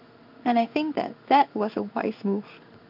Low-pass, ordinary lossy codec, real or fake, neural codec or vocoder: 5.4 kHz; AAC, 48 kbps; fake; codec, 16 kHz in and 24 kHz out, 1 kbps, XY-Tokenizer